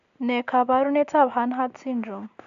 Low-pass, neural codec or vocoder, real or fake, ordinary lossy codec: 7.2 kHz; none; real; AAC, 64 kbps